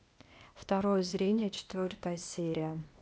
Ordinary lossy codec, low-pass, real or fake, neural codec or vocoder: none; none; fake; codec, 16 kHz, 0.8 kbps, ZipCodec